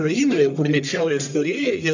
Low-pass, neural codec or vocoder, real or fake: 7.2 kHz; codec, 44.1 kHz, 1.7 kbps, Pupu-Codec; fake